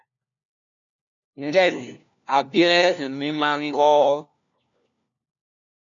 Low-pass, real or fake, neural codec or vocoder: 7.2 kHz; fake; codec, 16 kHz, 1 kbps, FunCodec, trained on LibriTTS, 50 frames a second